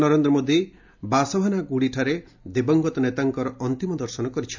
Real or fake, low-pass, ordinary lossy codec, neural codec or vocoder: real; 7.2 kHz; MP3, 64 kbps; none